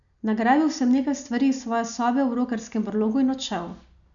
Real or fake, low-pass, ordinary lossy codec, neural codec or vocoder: real; 7.2 kHz; none; none